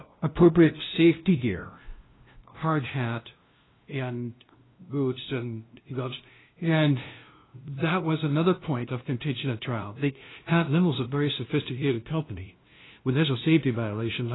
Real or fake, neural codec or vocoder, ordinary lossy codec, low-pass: fake; codec, 16 kHz, 0.5 kbps, FunCodec, trained on LibriTTS, 25 frames a second; AAC, 16 kbps; 7.2 kHz